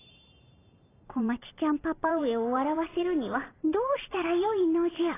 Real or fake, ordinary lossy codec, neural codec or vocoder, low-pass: fake; AAC, 16 kbps; vocoder, 44.1 kHz, 128 mel bands every 512 samples, BigVGAN v2; 3.6 kHz